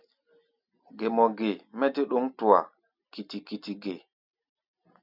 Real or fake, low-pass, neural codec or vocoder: real; 5.4 kHz; none